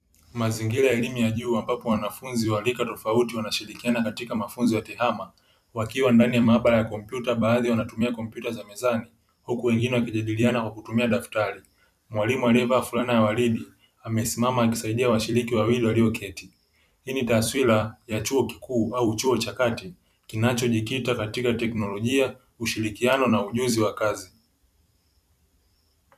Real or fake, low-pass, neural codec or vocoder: fake; 14.4 kHz; vocoder, 44.1 kHz, 128 mel bands every 256 samples, BigVGAN v2